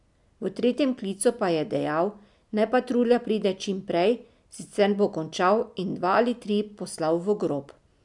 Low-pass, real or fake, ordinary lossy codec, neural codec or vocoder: 10.8 kHz; real; none; none